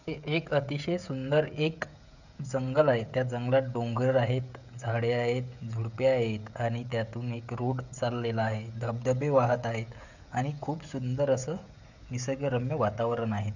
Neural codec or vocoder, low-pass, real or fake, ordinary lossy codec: codec, 16 kHz, 16 kbps, FreqCodec, smaller model; 7.2 kHz; fake; none